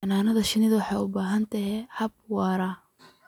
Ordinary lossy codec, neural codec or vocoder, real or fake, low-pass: none; none; real; 19.8 kHz